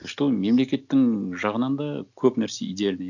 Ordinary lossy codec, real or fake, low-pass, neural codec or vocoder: none; real; 7.2 kHz; none